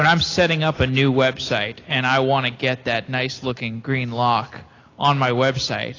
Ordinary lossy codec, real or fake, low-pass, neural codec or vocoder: AAC, 32 kbps; real; 7.2 kHz; none